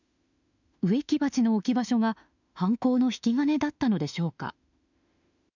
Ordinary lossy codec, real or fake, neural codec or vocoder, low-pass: none; fake; autoencoder, 48 kHz, 32 numbers a frame, DAC-VAE, trained on Japanese speech; 7.2 kHz